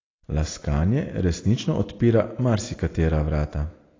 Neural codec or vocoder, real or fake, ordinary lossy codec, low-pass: none; real; AAC, 32 kbps; 7.2 kHz